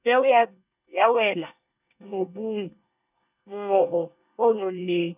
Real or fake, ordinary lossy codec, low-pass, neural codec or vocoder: fake; none; 3.6 kHz; codec, 24 kHz, 1 kbps, SNAC